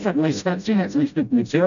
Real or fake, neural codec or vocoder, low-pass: fake; codec, 16 kHz, 0.5 kbps, FreqCodec, smaller model; 7.2 kHz